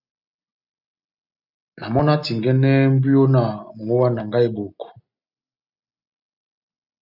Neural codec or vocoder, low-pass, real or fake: none; 5.4 kHz; real